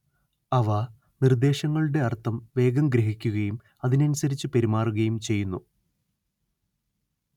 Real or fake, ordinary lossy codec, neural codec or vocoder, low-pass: real; none; none; 19.8 kHz